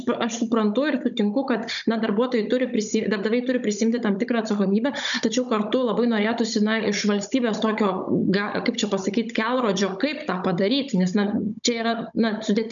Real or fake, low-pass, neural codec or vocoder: fake; 7.2 kHz; codec, 16 kHz, 16 kbps, FunCodec, trained on Chinese and English, 50 frames a second